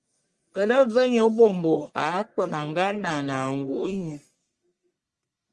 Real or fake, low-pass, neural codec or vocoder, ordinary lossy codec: fake; 10.8 kHz; codec, 44.1 kHz, 1.7 kbps, Pupu-Codec; Opus, 32 kbps